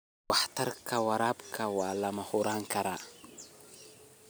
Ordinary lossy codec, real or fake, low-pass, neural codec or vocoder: none; real; none; none